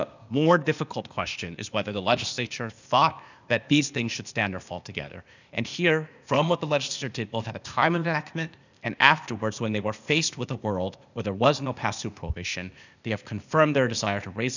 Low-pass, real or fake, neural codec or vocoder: 7.2 kHz; fake; codec, 16 kHz, 0.8 kbps, ZipCodec